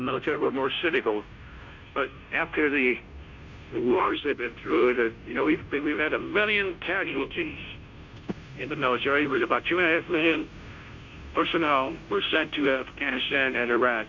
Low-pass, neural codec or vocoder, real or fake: 7.2 kHz; codec, 16 kHz, 0.5 kbps, FunCodec, trained on Chinese and English, 25 frames a second; fake